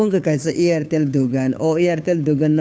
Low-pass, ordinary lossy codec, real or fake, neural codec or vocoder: none; none; fake; codec, 16 kHz, 2 kbps, FunCodec, trained on Chinese and English, 25 frames a second